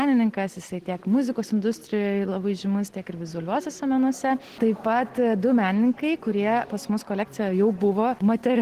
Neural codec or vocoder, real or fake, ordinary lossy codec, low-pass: none; real; Opus, 16 kbps; 14.4 kHz